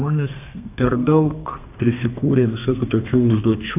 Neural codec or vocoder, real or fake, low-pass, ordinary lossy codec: codec, 32 kHz, 1.9 kbps, SNAC; fake; 3.6 kHz; AAC, 32 kbps